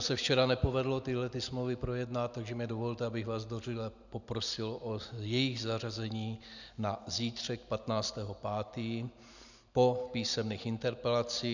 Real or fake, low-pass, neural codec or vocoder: real; 7.2 kHz; none